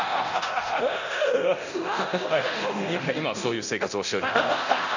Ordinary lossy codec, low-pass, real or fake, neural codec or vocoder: none; 7.2 kHz; fake; codec, 24 kHz, 0.9 kbps, DualCodec